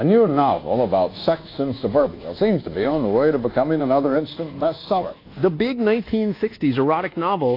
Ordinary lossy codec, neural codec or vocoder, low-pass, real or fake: AAC, 32 kbps; codec, 24 kHz, 1.2 kbps, DualCodec; 5.4 kHz; fake